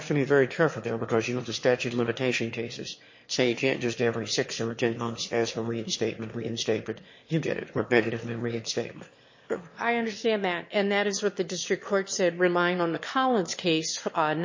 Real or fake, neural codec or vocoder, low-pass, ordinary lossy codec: fake; autoencoder, 22.05 kHz, a latent of 192 numbers a frame, VITS, trained on one speaker; 7.2 kHz; MP3, 32 kbps